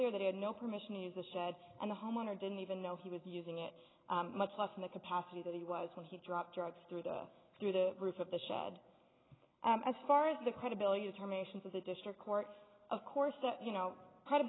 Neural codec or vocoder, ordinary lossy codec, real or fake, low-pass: none; AAC, 16 kbps; real; 7.2 kHz